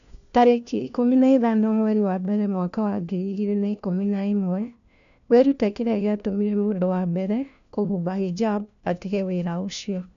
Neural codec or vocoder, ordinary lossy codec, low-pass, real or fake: codec, 16 kHz, 1 kbps, FunCodec, trained on LibriTTS, 50 frames a second; none; 7.2 kHz; fake